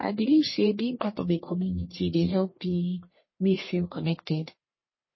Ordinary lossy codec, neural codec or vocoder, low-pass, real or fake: MP3, 24 kbps; codec, 44.1 kHz, 1.7 kbps, Pupu-Codec; 7.2 kHz; fake